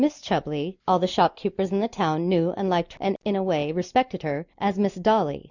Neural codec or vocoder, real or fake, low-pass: none; real; 7.2 kHz